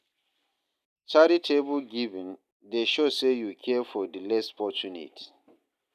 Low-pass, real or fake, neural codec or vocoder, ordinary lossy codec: 14.4 kHz; real; none; none